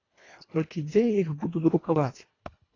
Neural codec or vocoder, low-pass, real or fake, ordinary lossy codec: codec, 24 kHz, 1.5 kbps, HILCodec; 7.2 kHz; fake; AAC, 32 kbps